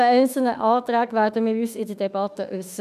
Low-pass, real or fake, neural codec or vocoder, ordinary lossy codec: 14.4 kHz; fake; autoencoder, 48 kHz, 32 numbers a frame, DAC-VAE, trained on Japanese speech; none